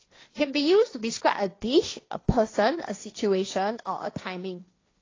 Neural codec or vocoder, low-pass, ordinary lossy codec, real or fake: codec, 16 kHz, 1.1 kbps, Voila-Tokenizer; 7.2 kHz; AAC, 32 kbps; fake